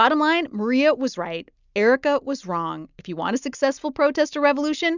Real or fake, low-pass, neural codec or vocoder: real; 7.2 kHz; none